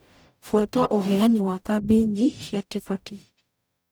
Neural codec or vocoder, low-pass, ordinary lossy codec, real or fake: codec, 44.1 kHz, 0.9 kbps, DAC; none; none; fake